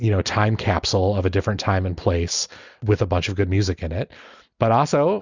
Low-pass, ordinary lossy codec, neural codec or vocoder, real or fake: 7.2 kHz; Opus, 64 kbps; none; real